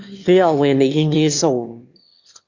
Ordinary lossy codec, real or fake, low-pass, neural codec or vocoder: Opus, 64 kbps; fake; 7.2 kHz; autoencoder, 22.05 kHz, a latent of 192 numbers a frame, VITS, trained on one speaker